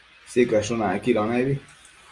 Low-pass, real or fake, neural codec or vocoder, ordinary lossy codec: 10.8 kHz; real; none; Opus, 32 kbps